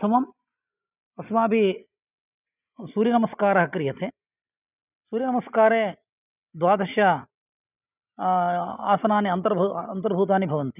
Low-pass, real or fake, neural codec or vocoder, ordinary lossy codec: 3.6 kHz; real; none; none